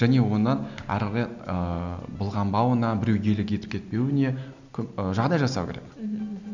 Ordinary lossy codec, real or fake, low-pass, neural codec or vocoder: none; real; 7.2 kHz; none